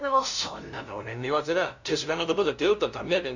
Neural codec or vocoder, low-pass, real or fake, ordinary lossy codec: codec, 16 kHz, 0.5 kbps, FunCodec, trained on LibriTTS, 25 frames a second; 7.2 kHz; fake; none